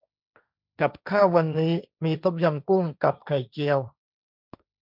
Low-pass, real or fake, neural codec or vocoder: 5.4 kHz; fake; codec, 16 kHz, 1.1 kbps, Voila-Tokenizer